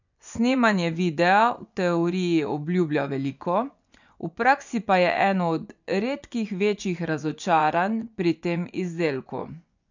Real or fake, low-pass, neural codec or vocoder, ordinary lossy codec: fake; 7.2 kHz; vocoder, 24 kHz, 100 mel bands, Vocos; none